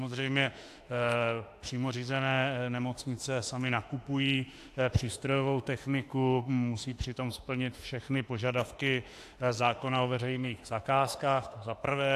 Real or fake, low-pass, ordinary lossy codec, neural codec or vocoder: fake; 14.4 kHz; AAC, 64 kbps; autoencoder, 48 kHz, 32 numbers a frame, DAC-VAE, trained on Japanese speech